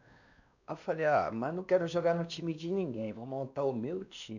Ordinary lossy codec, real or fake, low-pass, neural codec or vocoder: none; fake; 7.2 kHz; codec, 16 kHz, 2 kbps, X-Codec, WavLM features, trained on Multilingual LibriSpeech